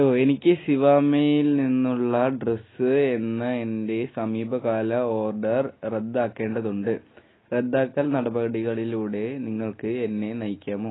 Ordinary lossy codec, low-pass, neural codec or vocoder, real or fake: AAC, 16 kbps; 7.2 kHz; none; real